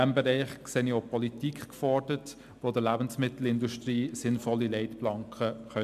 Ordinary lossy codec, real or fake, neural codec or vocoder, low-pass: MP3, 96 kbps; real; none; 14.4 kHz